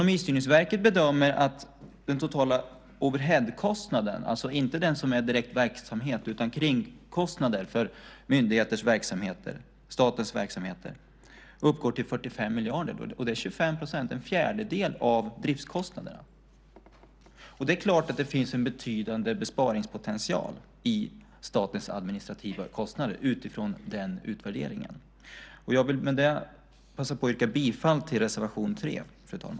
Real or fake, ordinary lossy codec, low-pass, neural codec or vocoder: real; none; none; none